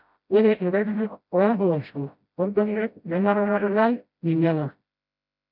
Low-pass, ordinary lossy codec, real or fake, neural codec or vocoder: 5.4 kHz; none; fake; codec, 16 kHz, 0.5 kbps, FreqCodec, smaller model